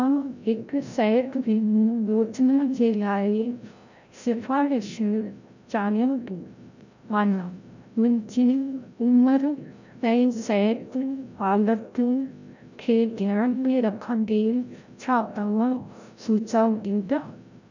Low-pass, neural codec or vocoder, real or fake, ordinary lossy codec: 7.2 kHz; codec, 16 kHz, 0.5 kbps, FreqCodec, larger model; fake; none